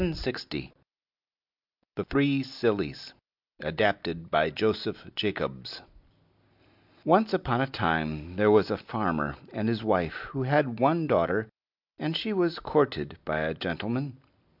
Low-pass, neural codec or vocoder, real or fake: 5.4 kHz; none; real